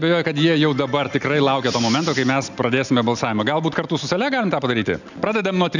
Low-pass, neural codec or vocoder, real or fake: 7.2 kHz; none; real